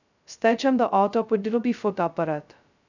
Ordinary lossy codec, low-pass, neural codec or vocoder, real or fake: none; 7.2 kHz; codec, 16 kHz, 0.2 kbps, FocalCodec; fake